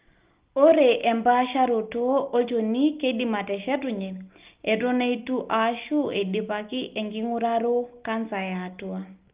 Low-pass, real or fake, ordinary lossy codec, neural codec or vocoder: 3.6 kHz; real; Opus, 32 kbps; none